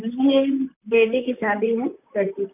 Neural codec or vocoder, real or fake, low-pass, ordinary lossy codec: vocoder, 44.1 kHz, 128 mel bands, Pupu-Vocoder; fake; 3.6 kHz; none